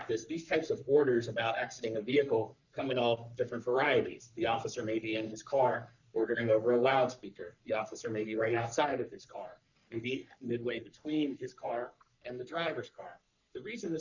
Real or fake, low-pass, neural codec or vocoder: fake; 7.2 kHz; codec, 44.1 kHz, 3.4 kbps, Pupu-Codec